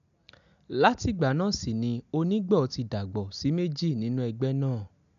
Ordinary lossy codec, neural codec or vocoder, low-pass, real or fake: none; none; 7.2 kHz; real